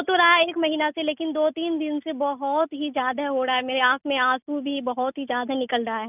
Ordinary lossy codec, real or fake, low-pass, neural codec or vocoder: none; real; 3.6 kHz; none